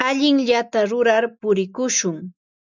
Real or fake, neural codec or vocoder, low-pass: real; none; 7.2 kHz